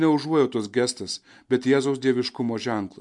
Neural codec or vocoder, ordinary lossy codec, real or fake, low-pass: none; MP3, 64 kbps; real; 10.8 kHz